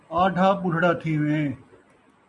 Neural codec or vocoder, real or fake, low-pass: none; real; 10.8 kHz